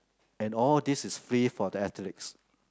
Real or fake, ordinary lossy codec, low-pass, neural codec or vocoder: real; none; none; none